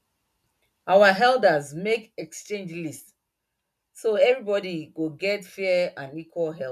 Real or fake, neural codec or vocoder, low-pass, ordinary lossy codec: real; none; 14.4 kHz; none